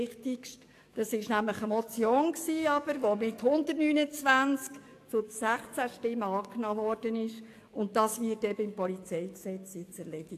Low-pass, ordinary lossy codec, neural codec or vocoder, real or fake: 14.4 kHz; AAC, 64 kbps; codec, 44.1 kHz, 7.8 kbps, DAC; fake